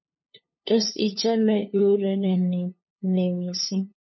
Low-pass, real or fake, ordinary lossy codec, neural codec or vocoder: 7.2 kHz; fake; MP3, 24 kbps; codec, 16 kHz, 2 kbps, FunCodec, trained on LibriTTS, 25 frames a second